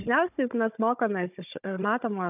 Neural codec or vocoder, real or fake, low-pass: codec, 16 kHz, 16 kbps, FunCodec, trained on Chinese and English, 50 frames a second; fake; 3.6 kHz